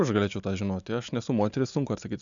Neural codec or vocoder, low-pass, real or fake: none; 7.2 kHz; real